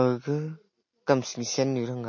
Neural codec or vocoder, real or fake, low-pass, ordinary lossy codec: none; real; 7.2 kHz; MP3, 32 kbps